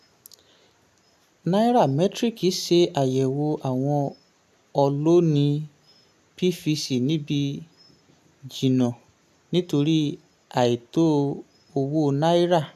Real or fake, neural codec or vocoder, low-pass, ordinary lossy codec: real; none; 14.4 kHz; none